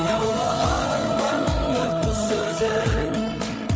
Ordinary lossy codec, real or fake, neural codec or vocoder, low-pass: none; fake; codec, 16 kHz, 8 kbps, FreqCodec, larger model; none